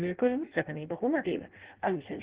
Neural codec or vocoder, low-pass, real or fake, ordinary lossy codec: codec, 16 kHz in and 24 kHz out, 0.6 kbps, FireRedTTS-2 codec; 3.6 kHz; fake; Opus, 16 kbps